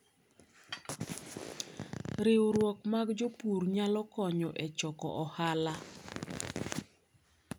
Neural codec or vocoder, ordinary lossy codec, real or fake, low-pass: none; none; real; none